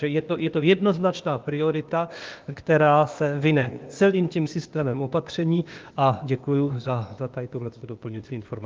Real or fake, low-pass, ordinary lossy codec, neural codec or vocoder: fake; 7.2 kHz; Opus, 32 kbps; codec, 16 kHz, 0.8 kbps, ZipCodec